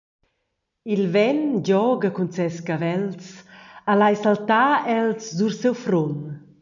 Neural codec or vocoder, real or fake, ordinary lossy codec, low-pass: none; real; MP3, 96 kbps; 7.2 kHz